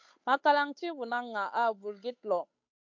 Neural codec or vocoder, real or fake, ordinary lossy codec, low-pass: codec, 16 kHz, 8 kbps, FunCodec, trained on Chinese and English, 25 frames a second; fake; MP3, 48 kbps; 7.2 kHz